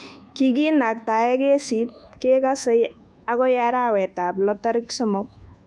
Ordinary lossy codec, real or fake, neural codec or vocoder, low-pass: none; fake; codec, 24 kHz, 1.2 kbps, DualCodec; none